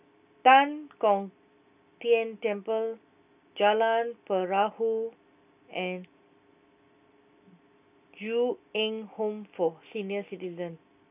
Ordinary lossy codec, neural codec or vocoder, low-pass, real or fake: none; none; 3.6 kHz; real